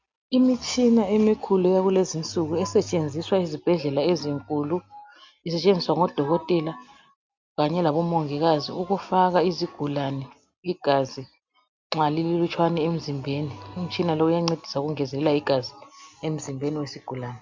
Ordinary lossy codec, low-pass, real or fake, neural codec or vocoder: MP3, 64 kbps; 7.2 kHz; real; none